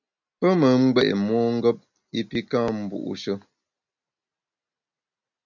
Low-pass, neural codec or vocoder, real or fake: 7.2 kHz; none; real